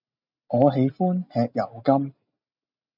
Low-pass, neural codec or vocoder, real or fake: 5.4 kHz; none; real